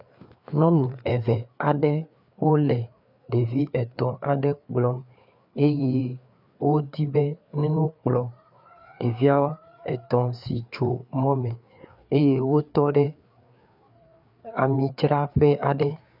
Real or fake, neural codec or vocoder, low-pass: fake; codec, 16 kHz, 4 kbps, FreqCodec, larger model; 5.4 kHz